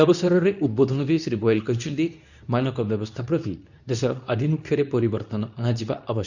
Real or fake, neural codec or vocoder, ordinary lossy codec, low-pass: fake; codec, 24 kHz, 0.9 kbps, WavTokenizer, medium speech release version 2; none; 7.2 kHz